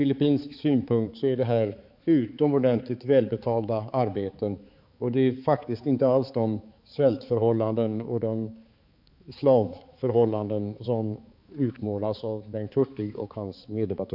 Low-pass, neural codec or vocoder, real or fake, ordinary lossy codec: 5.4 kHz; codec, 16 kHz, 4 kbps, X-Codec, HuBERT features, trained on balanced general audio; fake; AAC, 48 kbps